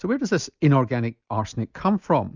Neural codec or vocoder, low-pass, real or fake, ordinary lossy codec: none; 7.2 kHz; real; Opus, 64 kbps